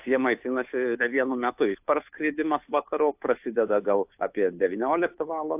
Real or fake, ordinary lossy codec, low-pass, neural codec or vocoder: fake; AAC, 32 kbps; 3.6 kHz; codec, 16 kHz, 2 kbps, FunCodec, trained on Chinese and English, 25 frames a second